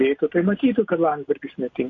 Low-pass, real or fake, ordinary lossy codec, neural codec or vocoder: 7.2 kHz; real; MP3, 48 kbps; none